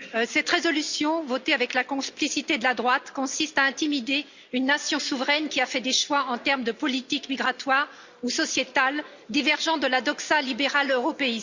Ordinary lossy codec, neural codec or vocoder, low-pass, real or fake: Opus, 64 kbps; none; 7.2 kHz; real